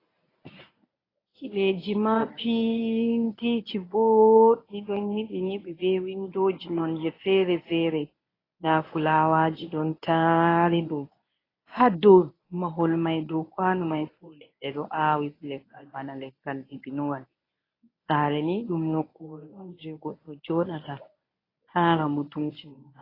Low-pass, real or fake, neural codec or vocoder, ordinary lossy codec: 5.4 kHz; fake; codec, 24 kHz, 0.9 kbps, WavTokenizer, medium speech release version 1; AAC, 24 kbps